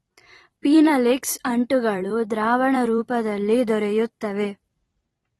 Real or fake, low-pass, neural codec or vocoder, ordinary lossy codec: fake; 19.8 kHz; vocoder, 44.1 kHz, 128 mel bands every 256 samples, BigVGAN v2; AAC, 32 kbps